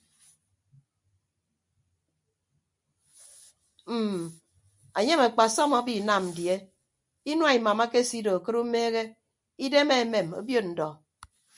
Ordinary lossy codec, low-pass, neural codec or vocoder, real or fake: MP3, 96 kbps; 10.8 kHz; none; real